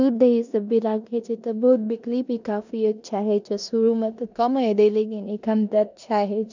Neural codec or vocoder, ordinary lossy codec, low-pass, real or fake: codec, 16 kHz in and 24 kHz out, 0.9 kbps, LongCat-Audio-Codec, four codebook decoder; none; 7.2 kHz; fake